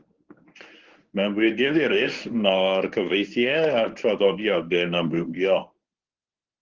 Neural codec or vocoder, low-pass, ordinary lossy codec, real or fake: codec, 24 kHz, 0.9 kbps, WavTokenizer, medium speech release version 1; 7.2 kHz; Opus, 16 kbps; fake